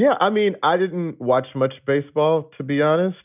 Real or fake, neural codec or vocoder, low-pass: real; none; 3.6 kHz